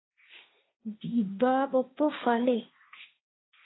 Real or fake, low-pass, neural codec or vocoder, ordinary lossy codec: fake; 7.2 kHz; codec, 16 kHz, 1.1 kbps, Voila-Tokenizer; AAC, 16 kbps